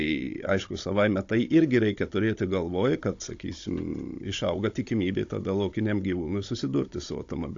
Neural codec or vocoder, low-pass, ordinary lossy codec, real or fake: codec, 16 kHz, 16 kbps, FunCodec, trained on Chinese and English, 50 frames a second; 7.2 kHz; AAC, 48 kbps; fake